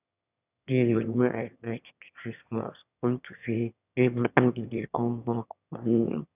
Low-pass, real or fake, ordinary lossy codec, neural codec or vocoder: 3.6 kHz; fake; none; autoencoder, 22.05 kHz, a latent of 192 numbers a frame, VITS, trained on one speaker